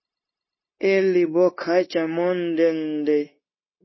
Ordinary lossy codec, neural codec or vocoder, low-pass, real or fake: MP3, 24 kbps; codec, 16 kHz, 0.9 kbps, LongCat-Audio-Codec; 7.2 kHz; fake